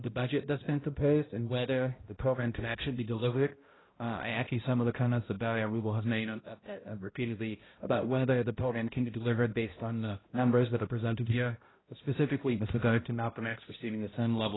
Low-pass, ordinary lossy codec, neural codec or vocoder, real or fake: 7.2 kHz; AAC, 16 kbps; codec, 16 kHz, 0.5 kbps, X-Codec, HuBERT features, trained on balanced general audio; fake